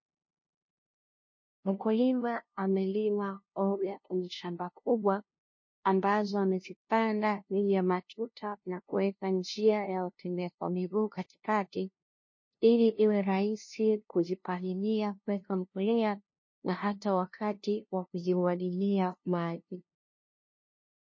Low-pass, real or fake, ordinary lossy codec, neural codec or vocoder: 7.2 kHz; fake; MP3, 32 kbps; codec, 16 kHz, 0.5 kbps, FunCodec, trained on LibriTTS, 25 frames a second